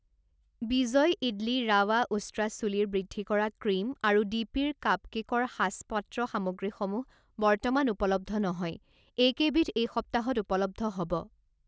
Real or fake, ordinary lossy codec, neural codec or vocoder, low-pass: real; none; none; none